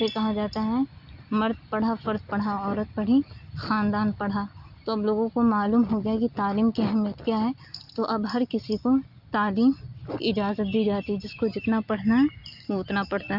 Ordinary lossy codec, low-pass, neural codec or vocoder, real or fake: Opus, 64 kbps; 5.4 kHz; none; real